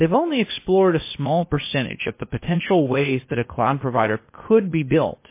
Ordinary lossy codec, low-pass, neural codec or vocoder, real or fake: MP3, 24 kbps; 3.6 kHz; codec, 16 kHz, 0.8 kbps, ZipCodec; fake